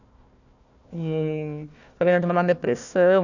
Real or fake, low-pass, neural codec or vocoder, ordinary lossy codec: fake; 7.2 kHz; codec, 16 kHz, 1 kbps, FunCodec, trained on Chinese and English, 50 frames a second; none